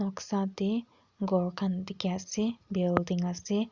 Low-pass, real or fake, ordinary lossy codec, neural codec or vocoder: 7.2 kHz; real; Opus, 64 kbps; none